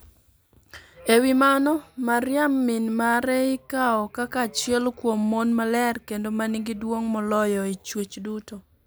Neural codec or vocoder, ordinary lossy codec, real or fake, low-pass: none; none; real; none